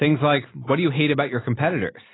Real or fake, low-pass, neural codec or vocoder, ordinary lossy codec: real; 7.2 kHz; none; AAC, 16 kbps